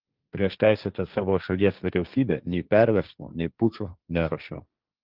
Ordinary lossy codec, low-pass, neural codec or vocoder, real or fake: Opus, 24 kbps; 5.4 kHz; codec, 16 kHz, 1.1 kbps, Voila-Tokenizer; fake